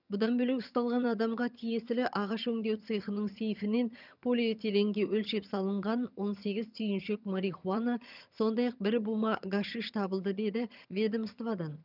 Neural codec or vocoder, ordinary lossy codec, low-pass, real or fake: vocoder, 22.05 kHz, 80 mel bands, HiFi-GAN; none; 5.4 kHz; fake